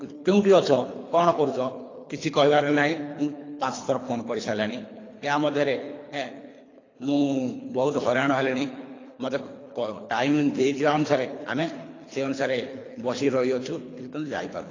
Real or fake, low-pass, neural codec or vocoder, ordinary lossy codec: fake; 7.2 kHz; codec, 24 kHz, 3 kbps, HILCodec; AAC, 32 kbps